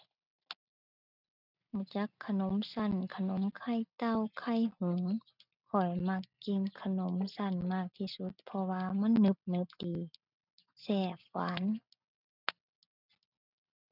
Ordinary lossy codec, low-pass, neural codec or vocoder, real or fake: none; 5.4 kHz; none; real